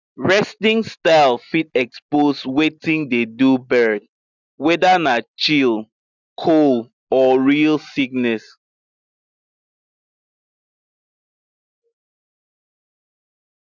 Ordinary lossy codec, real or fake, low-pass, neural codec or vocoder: none; real; 7.2 kHz; none